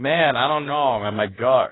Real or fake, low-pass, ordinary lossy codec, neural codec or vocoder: fake; 7.2 kHz; AAC, 16 kbps; codec, 16 kHz, about 1 kbps, DyCAST, with the encoder's durations